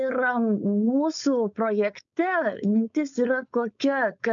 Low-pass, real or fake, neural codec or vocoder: 7.2 kHz; fake; codec, 16 kHz, 4.8 kbps, FACodec